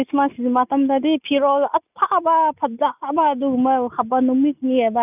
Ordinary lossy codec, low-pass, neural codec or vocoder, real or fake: none; 3.6 kHz; none; real